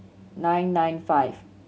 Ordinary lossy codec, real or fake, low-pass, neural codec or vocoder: none; real; none; none